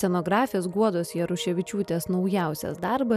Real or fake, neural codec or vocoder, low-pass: real; none; 14.4 kHz